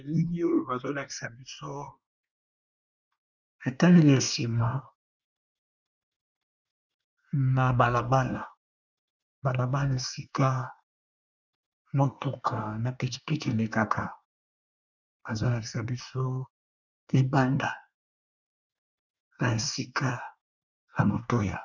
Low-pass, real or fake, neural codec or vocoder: 7.2 kHz; fake; codec, 24 kHz, 1 kbps, SNAC